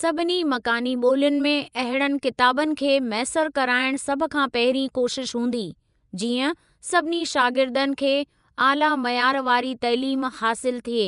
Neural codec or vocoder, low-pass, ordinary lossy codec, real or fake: vocoder, 24 kHz, 100 mel bands, Vocos; 10.8 kHz; none; fake